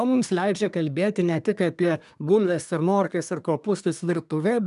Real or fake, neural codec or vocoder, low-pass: fake; codec, 24 kHz, 1 kbps, SNAC; 10.8 kHz